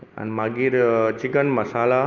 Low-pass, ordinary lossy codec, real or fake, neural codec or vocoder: 7.2 kHz; Opus, 24 kbps; real; none